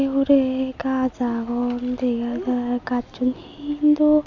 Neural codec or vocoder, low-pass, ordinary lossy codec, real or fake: none; 7.2 kHz; MP3, 64 kbps; real